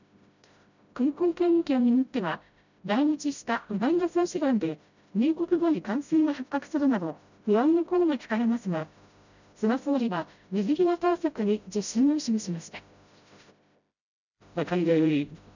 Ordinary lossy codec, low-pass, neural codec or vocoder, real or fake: none; 7.2 kHz; codec, 16 kHz, 0.5 kbps, FreqCodec, smaller model; fake